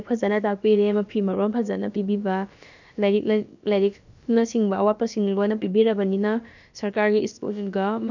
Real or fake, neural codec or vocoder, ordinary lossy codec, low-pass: fake; codec, 16 kHz, about 1 kbps, DyCAST, with the encoder's durations; none; 7.2 kHz